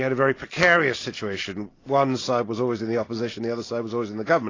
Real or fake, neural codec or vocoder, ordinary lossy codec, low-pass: real; none; AAC, 32 kbps; 7.2 kHz